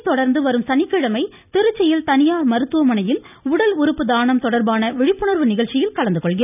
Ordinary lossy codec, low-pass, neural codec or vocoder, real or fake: none; 3.6 kHz; none; real